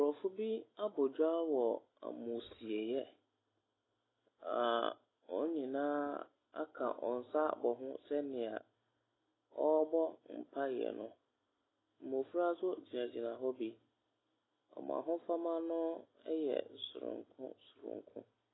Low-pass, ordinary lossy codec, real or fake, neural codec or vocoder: 7.2 kHz; AAC, 16 kbps; real; none